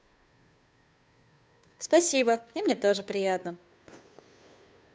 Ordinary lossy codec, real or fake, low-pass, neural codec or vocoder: none; fake; none; codec, 16 kHz, 2 kbps, FunCodec, trained on Chinese and English, 25 frames a second